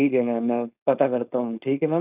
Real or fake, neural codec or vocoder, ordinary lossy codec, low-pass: fake; codec, 16 kHz, 4.8 kbps, FACodec; none; 3.6 kHz